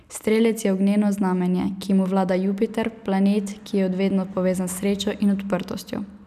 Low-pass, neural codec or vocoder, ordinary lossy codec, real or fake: 14.4 kHz; none; none; real